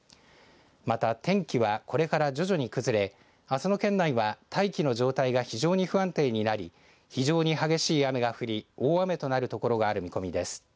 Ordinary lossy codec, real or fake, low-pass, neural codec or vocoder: none; real; none; none